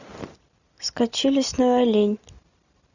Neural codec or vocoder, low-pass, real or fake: none; 7.2 kHz; real